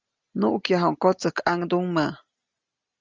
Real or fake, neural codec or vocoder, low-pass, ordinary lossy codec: real; none; 7.2 kHz; Opus, 32 kbps